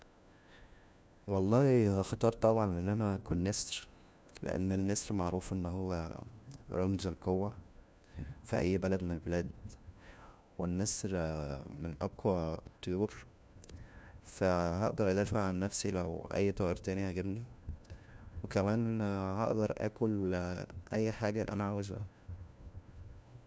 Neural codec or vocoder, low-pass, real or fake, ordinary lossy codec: codec, 16 kHz, 1 kbps, FunCodec, trained on LibriTTS, 50 frames a second; none; fake; none